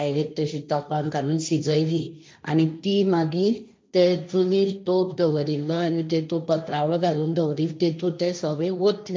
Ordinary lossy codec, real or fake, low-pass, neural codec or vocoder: none; fake; none; codec, 16 kHz, 1.1 kbps, Voila-Tokenizer